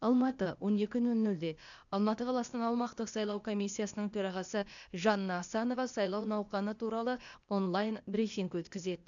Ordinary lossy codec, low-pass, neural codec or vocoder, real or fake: none; 7.2 kHz; codec, 16 kHz, 0.8 kbps, ZipCodec; fake